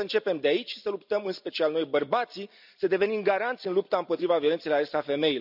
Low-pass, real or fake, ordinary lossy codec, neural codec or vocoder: 5.4 kHz; real; none; none